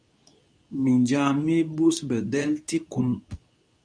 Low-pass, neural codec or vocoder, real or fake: 9.9 kHz; codec, 24 kHz, 0.9 kbps, WavTokenizer, medium speech release version 2; fake